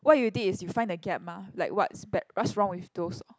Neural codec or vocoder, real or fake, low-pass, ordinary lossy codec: none; real; none; none